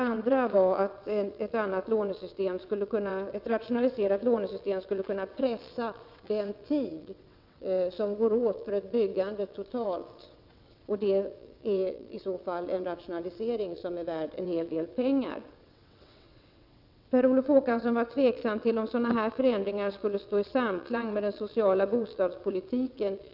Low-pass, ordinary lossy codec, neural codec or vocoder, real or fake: 5.4 kHz; none; vocoder, 22.05 kHz, 80 mel bands, WaveNeXt; fake